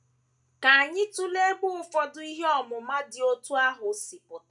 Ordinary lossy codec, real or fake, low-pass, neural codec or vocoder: none; real; 10.8 kHz; none